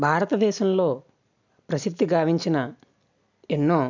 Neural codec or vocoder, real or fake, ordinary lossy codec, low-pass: none; real; none; 7.2 kHz